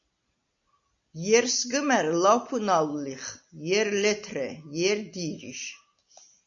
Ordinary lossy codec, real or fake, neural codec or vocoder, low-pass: MP3, 64 kbps; real; none; 7.2 kHz